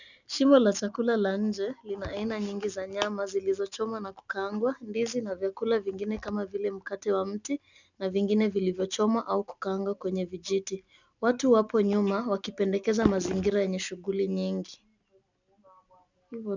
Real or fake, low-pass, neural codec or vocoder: real; 7.2 kHz; none